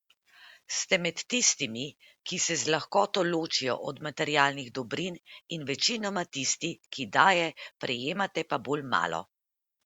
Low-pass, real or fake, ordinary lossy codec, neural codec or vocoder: 19.8 kHz; real; Opus, 64 kbps; none